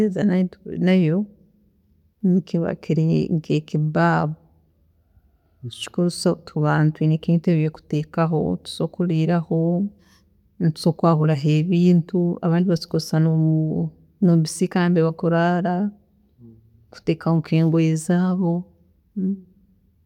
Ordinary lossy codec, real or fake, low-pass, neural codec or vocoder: none; real; 19.8 kHz; none